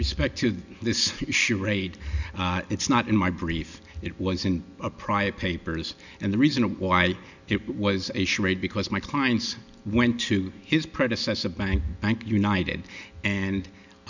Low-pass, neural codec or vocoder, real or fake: 7.2 kHz; none; real